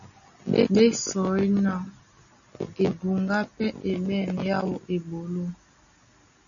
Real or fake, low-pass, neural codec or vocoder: real; 7.2 kHz; none